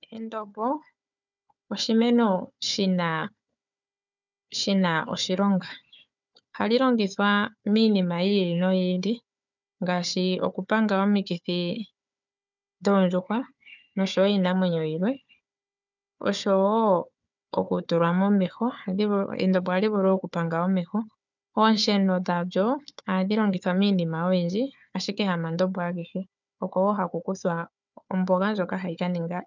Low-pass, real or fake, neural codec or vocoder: 7.2 kHz; fake; codec, 16 kHz, 4 kbps, FunCodec, trained on Chinese and English, 50 frames a second